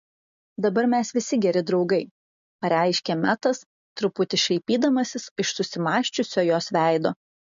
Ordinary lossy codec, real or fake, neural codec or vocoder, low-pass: MP3, 48 kbps; real; none; 7.2 kHz